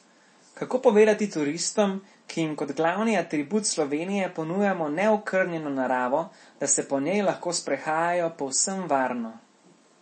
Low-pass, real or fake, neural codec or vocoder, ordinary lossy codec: 10.8 kHz; real; none; MP3, 32 kbps